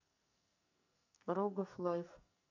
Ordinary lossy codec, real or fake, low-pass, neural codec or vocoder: none; fake; 7.2 kHz; codec, 44.1 kHz, 2.6 kbps, SNAC